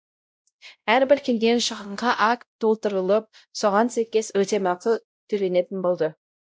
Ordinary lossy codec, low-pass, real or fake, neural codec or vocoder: none; none; fake; codec, 16 kHz, 0.5 kbps, X-Codec, WavLM features, trained on Multilingual LibriSpeech